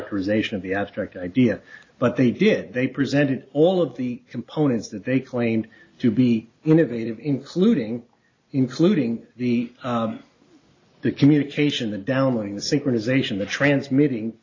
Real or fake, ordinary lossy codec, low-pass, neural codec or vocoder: real; AAC, 32 kbps; 7.2 kHz; none